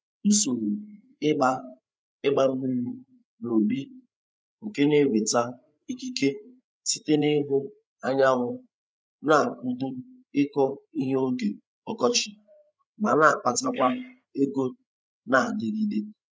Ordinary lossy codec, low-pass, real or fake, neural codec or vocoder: none; none; fake; codec, 16 kHz, 8 kbps, FreqCodec, larger model